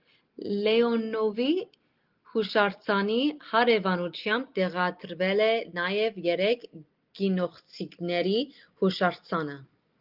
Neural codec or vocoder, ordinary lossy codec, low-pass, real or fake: none; Opus, 32 kbps; 5.4 kHz; real